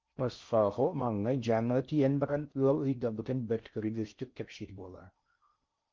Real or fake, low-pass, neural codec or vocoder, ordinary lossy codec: fake; 7.2 kHz; codec, 16 kHz in and 24 kHz out, 0.6 kbps, FocalCodec, streaming, 4096 codes; Opus, 24 kbps